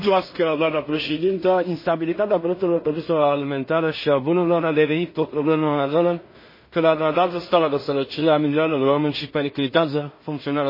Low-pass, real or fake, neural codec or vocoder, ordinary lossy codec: 5.4 kHz; fake; codec, 16 kHz in and 24 kHz out, 0.4 kbps, LongCat-Audio-Codec, two codebook decoder; MP3, 24 kbps